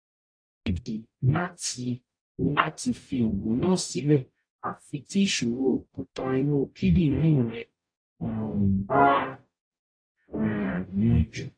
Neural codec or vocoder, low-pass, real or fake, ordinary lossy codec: codec, 44.1 kHz, 0.9 kbps, DAC; 9.9 kHz; fake; AAC, 48 kbps